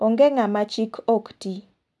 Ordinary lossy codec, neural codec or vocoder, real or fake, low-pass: none; none; real; none